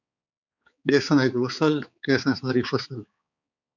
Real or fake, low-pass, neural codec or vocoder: fake; 7.2 kHz; codec, 16 kHz, 4 kbps, X-Codec, HuBERT features, trained on balanced general audio